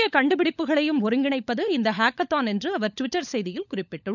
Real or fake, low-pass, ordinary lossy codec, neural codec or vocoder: fake; 7.2 kHz; none; codec, 16 kHz, 16 kbps, FunCodec, trained on LibriTTS, 50 frames a second